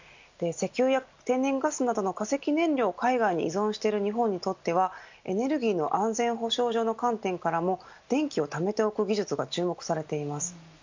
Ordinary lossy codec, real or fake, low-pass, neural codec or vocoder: MP3, 64 kbps; real; 7.2 kHz; none